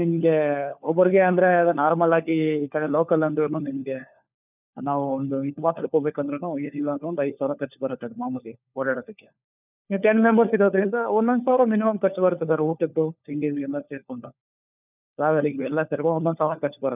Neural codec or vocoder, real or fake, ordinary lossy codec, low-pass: codec, 16 kHz, 4 kbps, FunCodec, trained on LibriTTS, 50 frames a second; fake; none; 3.6 kHz